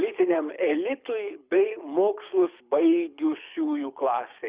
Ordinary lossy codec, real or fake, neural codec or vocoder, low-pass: Opus, 64 kbps; fake; codec, 24 kHz, 6 kbps, HILCodec; 3.6 kHz